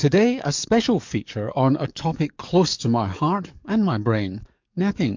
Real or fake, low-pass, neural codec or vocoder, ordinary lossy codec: fake; 7.2 kHz; codec, 44.1 kHz, 7.8 kbps, DAC; MP3, 64 kbps